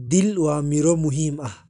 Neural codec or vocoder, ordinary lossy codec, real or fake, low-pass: none; none; real; 10.8 kHz